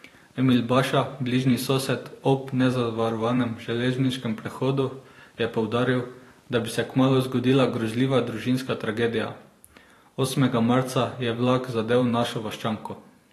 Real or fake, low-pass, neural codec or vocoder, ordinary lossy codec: fake; 14.4 kHz; vocoder, 44.1 kHz, 128 mel bands every 512 samples, BigVGAN v2; AAC, 48 kbps